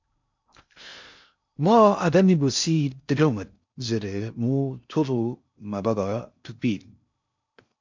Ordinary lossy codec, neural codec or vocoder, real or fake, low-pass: MP3, 64 kbps; codec, 16 kHz in and 24 kHz out, 0.6 kbps, FocalCodec, streaming, 2048 codes; fake; 7.2 kHz